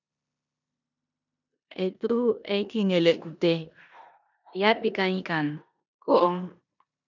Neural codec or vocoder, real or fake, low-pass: codec, 16 kHz in and 24 kHz out, 0.9 kbps, LongCat-Audio-Codec, four codebook decoder; fake; 7.2 kHz